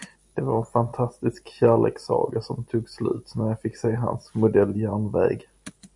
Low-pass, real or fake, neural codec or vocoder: 10.8 kHz; real; none